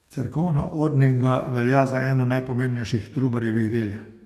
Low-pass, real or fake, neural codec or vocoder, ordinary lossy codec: 14.4 kHz; fake; codec, 44.1 kHz, 2.6 kbps, DAC; none